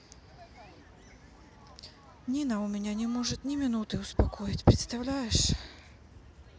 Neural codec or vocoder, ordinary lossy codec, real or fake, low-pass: none; none; real; none